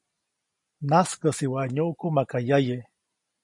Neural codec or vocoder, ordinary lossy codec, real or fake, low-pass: none; MP3, 48 kbps; real; 10.8 kHz